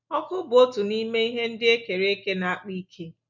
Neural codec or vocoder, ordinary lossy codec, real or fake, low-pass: none; none; real; 7.2 kHz